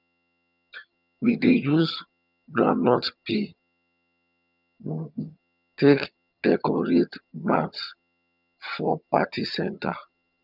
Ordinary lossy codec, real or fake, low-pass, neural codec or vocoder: none; fake; 5.4 kHz; vocoder, 22.05 kHz, 80 mel bands, HiFi-GAN